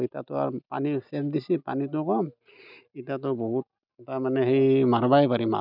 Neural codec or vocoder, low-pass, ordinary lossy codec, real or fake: none; 5.4 kHz; none; real